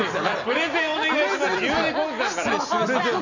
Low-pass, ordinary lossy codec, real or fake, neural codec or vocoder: 7.2 kHz; none; real; none